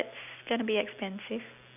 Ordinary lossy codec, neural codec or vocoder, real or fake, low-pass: none; none; real; 3.6 kHz